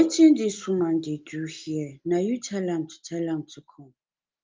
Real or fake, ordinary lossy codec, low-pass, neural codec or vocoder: real; Opus, 32 kbps; 7.2 kHz; none